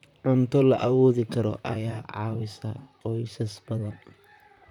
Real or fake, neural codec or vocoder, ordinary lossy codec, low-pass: fake; vocoder, 44.1 kHz, 128 mel bands, Pupu-Vocoder; none; 19.8 kHz